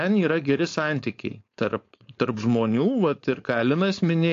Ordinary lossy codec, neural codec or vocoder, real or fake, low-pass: AAC, 48 kbps; codec, 16 kHz, 4.8 kbps, FACodec; fake; 7.2 kHz